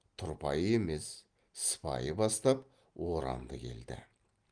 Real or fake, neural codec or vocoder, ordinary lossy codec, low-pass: real; none; Opus, 24 kbps; 9.9 kHz